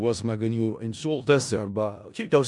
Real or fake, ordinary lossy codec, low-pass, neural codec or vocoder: fake; MP3, 96 kbps; 10.8 kHz; codec, 16 kHz in and 24 kHz out, 0.4 kbps, LongCat-Audio-Codec, four codebook decoder